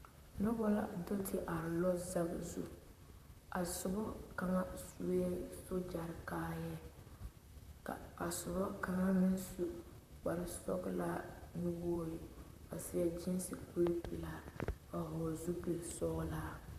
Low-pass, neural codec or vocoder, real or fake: 14.4 kHz; vocoder, 44.1 kHz, 128 mel bands, Pupu-Vocoder; fake